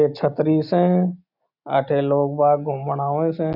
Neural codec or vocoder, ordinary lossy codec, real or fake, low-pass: none; none; real; 5.4 kHz